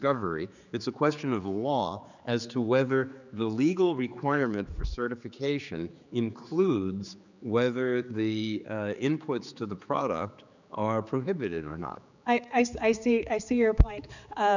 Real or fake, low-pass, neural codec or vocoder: fake; 7.2 kHz; codec, 16 kHz, 4 kbps, X-Codec, HuBERT features, trained on general audio